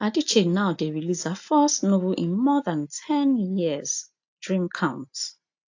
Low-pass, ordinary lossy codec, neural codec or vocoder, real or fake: 7.2 kHz; AAC, 48 kbps; none; real